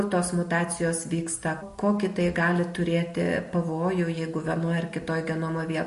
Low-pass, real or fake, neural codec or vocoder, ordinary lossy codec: 14.4 kHz; real; none; MP3, 48 kbps